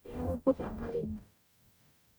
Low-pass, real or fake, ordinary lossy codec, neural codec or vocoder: none; fake; none; codec, 44.1 kHz, 0.9 kbps, DAC